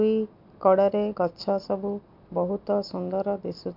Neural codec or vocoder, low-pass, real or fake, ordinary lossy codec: none; 5.4 kHz; real; MP3, 48 kbps